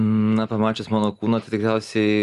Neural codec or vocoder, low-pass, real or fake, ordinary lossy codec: none; 10.8 kHz; real; Opus, 32 kbps